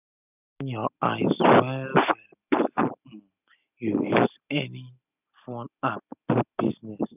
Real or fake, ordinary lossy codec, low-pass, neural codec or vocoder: real; none; 3.6 kHz; none